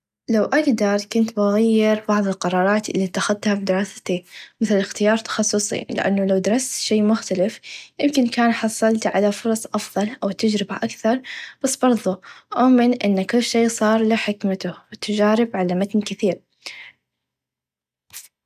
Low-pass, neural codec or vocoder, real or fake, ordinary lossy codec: 14.4 kHz; none; real; none